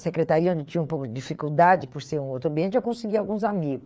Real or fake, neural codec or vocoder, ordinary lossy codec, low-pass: fake; codec, 16 kHz, 4 kbps, FreqCodec, larger model; none; none